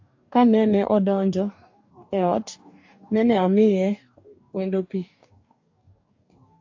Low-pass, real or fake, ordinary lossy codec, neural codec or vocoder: 7.2 kHz; fake; AAC, 48 kbps; codec, 44.1 kHz, 2.6 kbps, DAC